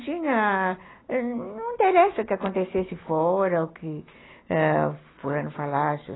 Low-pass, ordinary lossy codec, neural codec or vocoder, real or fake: 7.2 kHz; AAC, 16 kbps; none; real